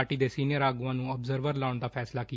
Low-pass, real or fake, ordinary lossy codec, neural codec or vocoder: none; real; none; none